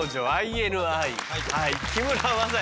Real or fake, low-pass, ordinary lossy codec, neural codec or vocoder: real; none; none; none